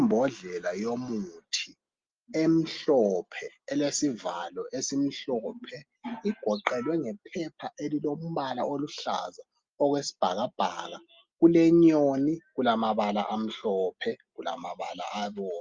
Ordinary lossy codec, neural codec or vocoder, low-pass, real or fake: Opus, 32 kbps; none; 7.2 kHz; real